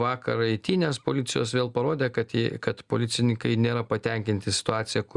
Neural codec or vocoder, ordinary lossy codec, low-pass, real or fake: none; Opus, 64 kbps; 10.8 kHz; real